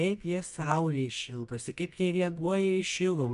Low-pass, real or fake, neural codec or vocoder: 10.8 kHz; fake; codec, 24 kHz, 0.9 kbps, WavTokenizer, medium music audio release